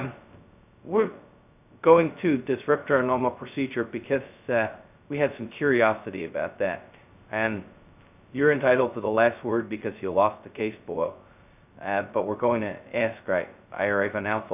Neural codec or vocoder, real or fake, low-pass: codec, 16 kHz, 0.2 kbps, FocalCodec; fake; 3.6 kHz